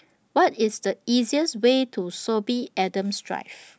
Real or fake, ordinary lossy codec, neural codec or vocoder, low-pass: real; none; none; none